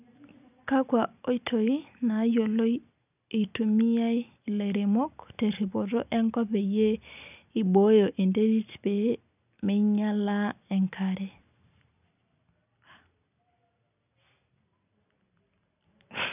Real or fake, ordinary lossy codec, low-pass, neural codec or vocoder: real; none; 3.6 kHz; none